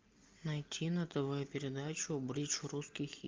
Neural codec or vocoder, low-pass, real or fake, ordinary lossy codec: none; 7.2 kHz; real; Opus, 32 kbps